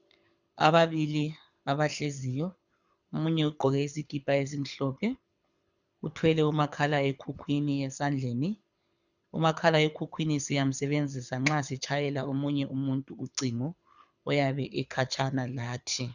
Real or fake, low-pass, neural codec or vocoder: fake; 7.2 kHz; codec, 24 kHz, 6 kbps, HILCodec